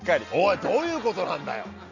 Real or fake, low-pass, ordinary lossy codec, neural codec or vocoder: real; 7.2 kHz; none; none